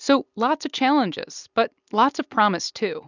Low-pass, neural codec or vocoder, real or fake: 7.2 kHz; none; real